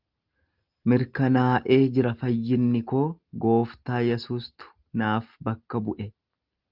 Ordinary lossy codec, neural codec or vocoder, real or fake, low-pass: Opus, 32 kbps; none; real; 5.4 kHz